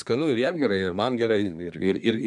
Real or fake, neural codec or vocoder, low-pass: fake; codec, 24 kHz, 1 kbps, SNAC; 10.8 kHz